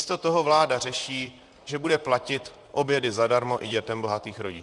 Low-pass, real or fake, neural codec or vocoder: 10.8 kHz; fake; vocoder, 44.1 kHz, 128 mel bands, Pupu-Vocoder